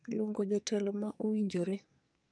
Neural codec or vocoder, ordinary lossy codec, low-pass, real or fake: codec, 44.1 kHz, 2.6 kbps, SNAC; none; 9.9 kHz; fake